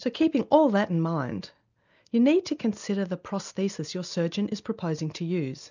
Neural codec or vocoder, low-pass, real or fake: none; 7.2 kHz; real